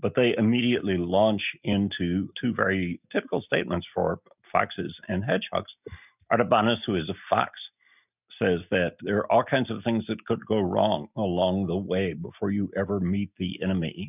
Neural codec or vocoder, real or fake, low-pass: none; real; 3.6 kHz